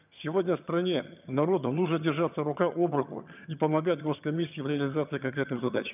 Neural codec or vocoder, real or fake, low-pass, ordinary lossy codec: vocoder, 22.05 kHz, 80 mel bands, HiFi-GAN; fake; 3.6 kHz; none